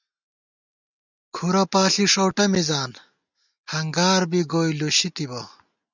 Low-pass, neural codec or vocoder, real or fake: 7.2 kHz; none; real